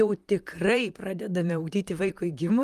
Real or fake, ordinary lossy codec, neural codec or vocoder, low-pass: fake; Opus, 32 kbps; vocoder, 44.1 kHz, 128 mel bands, Pupu-Vocoder; 14.4 kHz